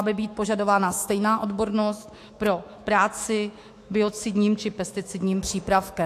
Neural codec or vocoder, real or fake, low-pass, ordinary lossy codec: autoencoder, 48 kHz, 128 numbers a frame, DAC-VAE, trained on Japanese speech; fake; 14.4 kHz; AAC, 64 kbps